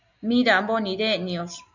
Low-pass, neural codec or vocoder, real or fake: 7.2 kHz; none; real